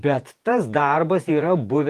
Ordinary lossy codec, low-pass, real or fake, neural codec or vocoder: Opus, 24 kbps; 14.4 kHz; fake; vocoder, 48 kHz, 128 mel bands, Vocos